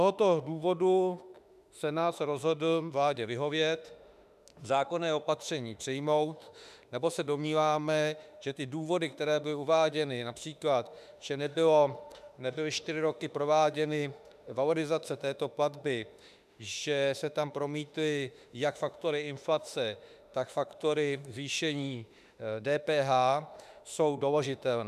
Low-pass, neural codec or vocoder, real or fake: 14.4 kHz; autoencoder, 48 kHz, 32 numbers a frame, DAC-VAE, trained on Japanese speech; fake